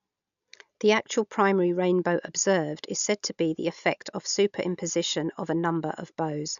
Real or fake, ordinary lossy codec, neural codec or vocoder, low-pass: real; none; none; 7.2 kHz